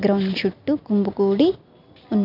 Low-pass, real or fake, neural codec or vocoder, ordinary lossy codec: 5.4 kHz; real; none; none